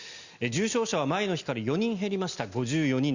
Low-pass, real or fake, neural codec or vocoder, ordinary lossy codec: 7.2 kHz; real; none; Opus, 64 kbps